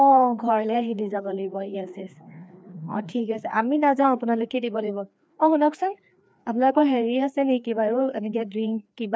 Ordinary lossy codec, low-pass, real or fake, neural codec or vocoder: none; none; fake; codec, 16 kHz, 2 kbps, FreqCodec, larger model